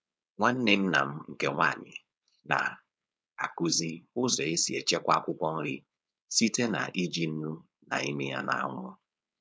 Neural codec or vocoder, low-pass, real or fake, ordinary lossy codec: codec, 16 kHz, 4.8 kbps, FACodec; none; fake; none